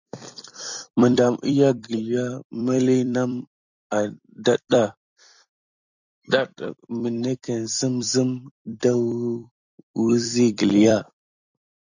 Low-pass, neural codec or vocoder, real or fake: 7.2 kHz; vocoder, 44.1 kHz, 128 mel bands every 256 samples, BigVGAN v2; fake